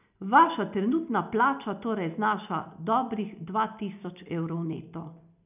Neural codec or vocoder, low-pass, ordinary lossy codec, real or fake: none; 3.6 kHz; none; real